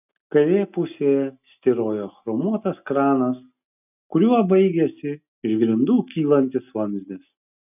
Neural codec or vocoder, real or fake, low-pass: none; real; 3.6 kHz